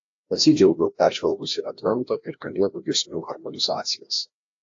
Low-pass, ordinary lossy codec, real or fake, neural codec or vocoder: 7.2 kHz; AAC, 48 kbps; fake; codec, 16 kHz, 1 kbps, FreqCodec, larger model